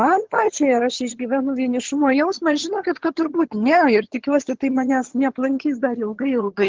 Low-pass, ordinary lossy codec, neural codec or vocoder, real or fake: 7.2 kHz; Opus, 16 kbps; vocoder, 22.05 kHz, 80 mel bands, HiFi-GAN; fake